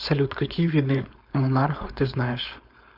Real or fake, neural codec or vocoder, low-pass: fake; codec, 16 kHz, 4.8 kbps, FACodec; 5.4 kHz